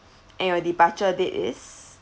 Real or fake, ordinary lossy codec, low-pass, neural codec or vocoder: real; none; none; none